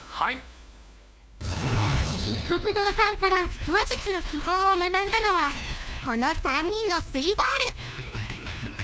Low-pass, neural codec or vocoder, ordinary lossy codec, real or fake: none; codec, 16 kHz, 1 kbps, FunCodec, trained on LibriTTS, 50 frames a second; none; fake